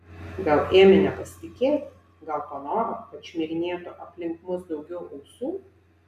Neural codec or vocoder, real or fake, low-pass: none; real; 14.4 kHz